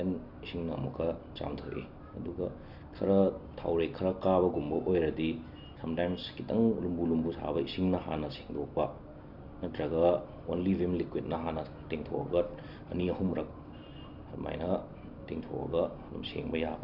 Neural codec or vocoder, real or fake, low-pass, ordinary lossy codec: none; real; 5.4 kHz; Opus, 64 kbps